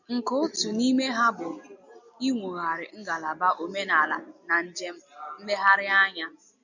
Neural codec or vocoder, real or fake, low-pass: none; real; 7.2 kHz